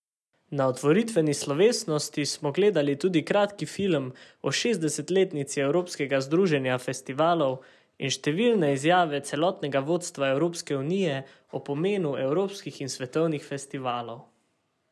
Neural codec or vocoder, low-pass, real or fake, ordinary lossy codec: none; none; real; none